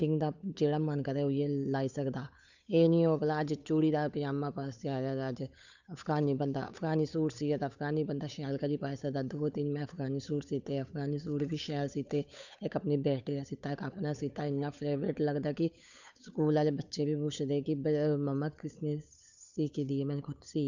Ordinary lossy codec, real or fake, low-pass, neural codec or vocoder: none; fake; 7.2 kHz; codec, 16 kHz, 8 kbps, FunCodec, trained on Chinese and English, 25 frames a second